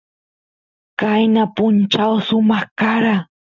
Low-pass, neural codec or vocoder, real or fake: 7.2 kHz; none; real